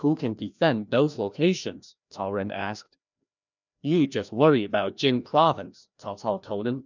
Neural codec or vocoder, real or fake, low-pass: codec, 16 kHz, 1 kbps, FreqCodec, larger model; fake; 7.2 kHz